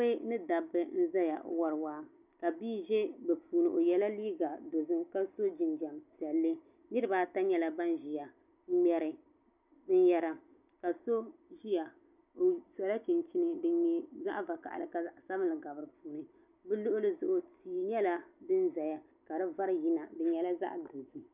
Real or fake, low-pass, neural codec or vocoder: real; 3.6 kHz; none